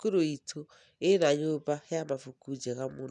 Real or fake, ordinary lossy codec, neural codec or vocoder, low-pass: real; none; none; 10.8 kHz